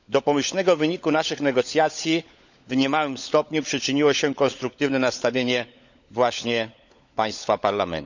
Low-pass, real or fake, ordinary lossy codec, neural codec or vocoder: 7.2 kHz; fake; none; codec, 16 kHz, 16 kbps, FunCodec, trained on LibriTTS, 50 frames a second